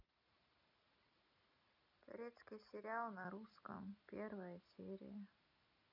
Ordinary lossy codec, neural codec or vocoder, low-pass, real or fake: none; none; 5.4 kHz; real